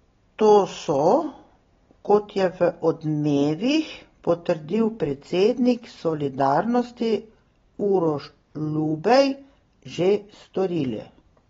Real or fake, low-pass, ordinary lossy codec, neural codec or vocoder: real; 7.2 kHz; AAC, 24 kbps; none